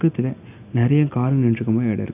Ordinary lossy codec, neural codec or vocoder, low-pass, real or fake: none; none; 3.6 kHz; real